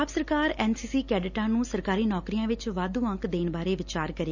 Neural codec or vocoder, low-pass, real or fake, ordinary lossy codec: none; 7.2 kHz; real; none